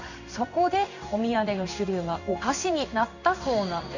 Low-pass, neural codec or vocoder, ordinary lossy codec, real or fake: 7.2 kHz; codec, 16 kHz in and 24 kHz out, 1 kbps, XY-Tokenizer; none; fake